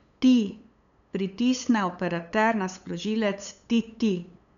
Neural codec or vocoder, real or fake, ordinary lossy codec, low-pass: codec, 16 kHz, 8 kbps, FunCodec, trained on LibriTTS, 25 frames a second; fake; none; 7.2 kHz